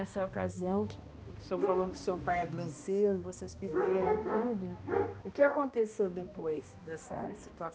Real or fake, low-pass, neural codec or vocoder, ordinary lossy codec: fake; none; codec, 16 kHz, 1 kbps, X-Codec, HuBERT features, trained on balanced general audio; none